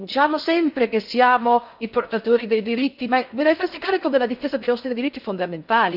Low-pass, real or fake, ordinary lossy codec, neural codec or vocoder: 5.4 kHz; fake; none; codec, 16 kHz in and 24 kHz out, 0.6 kbps, FocalCodec, streaming, 4096 codes